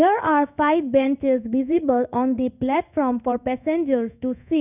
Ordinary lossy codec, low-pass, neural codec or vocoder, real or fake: none; 3.6 kHz; codec, 16 kHz in and 24 kHz out, 1 kbps, XY-Tokenizer; fake